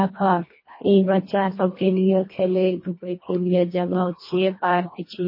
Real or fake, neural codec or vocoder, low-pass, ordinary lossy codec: fake; codec, 24 kHz, 1.5 kbps, HILCodec; 5.4 kHz; MP3, 24 kbps